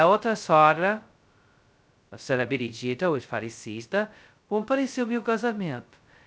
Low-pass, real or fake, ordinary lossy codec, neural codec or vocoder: none; fake; none; codec, 16 kHz, 0.2 kbps, FocalCodec